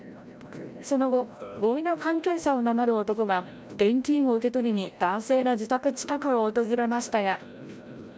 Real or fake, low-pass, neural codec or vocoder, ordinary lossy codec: fake; none; codec, 16 kHz, 0.5 kbps, FreqCodec, larger model; none